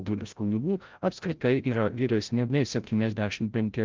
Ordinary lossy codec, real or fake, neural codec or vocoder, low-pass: Opus, 32 kbps; fake; codec, 16 kHz, 0.5 kbps, FreqCodec, larger model; 7.2 kHz